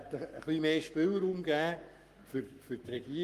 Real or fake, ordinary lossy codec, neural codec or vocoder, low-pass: fake; Opus, 32 kbps; codec, 44.1 kHz, 7.8 kbps, Pupu-Codec; 14.4 kHz